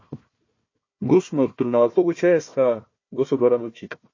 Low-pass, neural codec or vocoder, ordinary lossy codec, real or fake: 7.2 kHz; codec, 16 kHz, 1 kbps, FunCodec, trained on Chinese and English, 50 frames a second; MP3, 32 kbps; fake